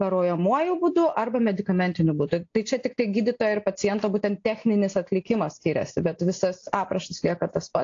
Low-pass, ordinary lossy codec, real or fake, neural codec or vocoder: 7.2 kHz; AAC, 48 kbps; real; none